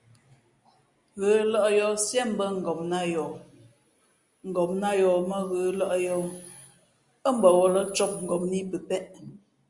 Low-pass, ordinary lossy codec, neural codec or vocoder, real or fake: 10.8 kHz; Opus, 64 kbps; none; real